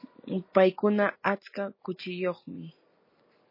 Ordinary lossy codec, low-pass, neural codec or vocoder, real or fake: MP3, 24 kbps; 5.4 kHz; none; real